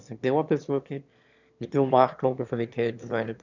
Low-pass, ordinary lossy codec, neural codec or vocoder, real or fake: 7.2 kHz; none; autoencoder, 22.05 kHz, a latent of 192 numbers a frame, VITS, trained on one speaker; fake